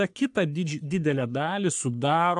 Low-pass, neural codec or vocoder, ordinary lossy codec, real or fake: 10.8 kHz; codec, 44.1 kHz, 3.4 kbps, Pupu-Codec; AAC, 64 kbps; fake